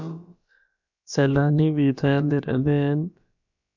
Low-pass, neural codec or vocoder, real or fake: 7.2 kHz; codec, 16 kHz, about 1 kbps, DyCAST, with the encoder's durations; fake